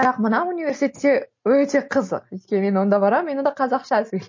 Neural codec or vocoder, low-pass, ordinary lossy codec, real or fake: none; 7.2 kHz; MP3, 32 kbps; real